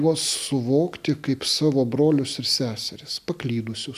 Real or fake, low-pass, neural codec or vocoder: real; 14.4 kHz; none